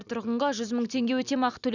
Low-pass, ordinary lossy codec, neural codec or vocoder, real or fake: 7.2 kHz; none; none; real